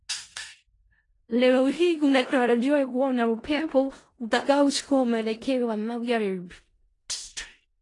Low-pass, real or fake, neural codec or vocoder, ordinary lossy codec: 10.8 kHz; fake; codec, 16 kHz in and 24 kHz out, 0.4 kbps, LongCat-Audio-Codec, four codebook decoder; AAC, 32 kbps